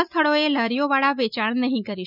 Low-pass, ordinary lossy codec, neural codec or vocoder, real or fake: 5.4 kHz; none; none; real